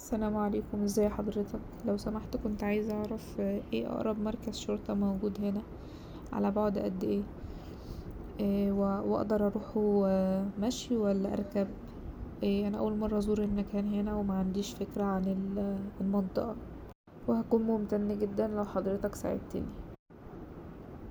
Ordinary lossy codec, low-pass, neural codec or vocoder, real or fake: none; none; none; real